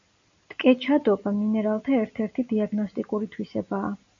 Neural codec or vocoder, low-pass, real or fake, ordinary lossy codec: none; 7.2 kHz; real; AAC, 64 kbps